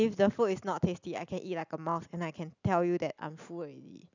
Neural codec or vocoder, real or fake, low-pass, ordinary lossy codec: none; real; 7.2 kHz; none